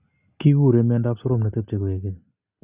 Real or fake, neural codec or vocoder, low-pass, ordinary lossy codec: real; none; 3.6 kHz; Opus, 64 kbps